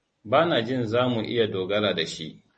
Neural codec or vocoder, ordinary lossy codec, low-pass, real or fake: none; MP3, 32 kbps; 10.8 kHz; real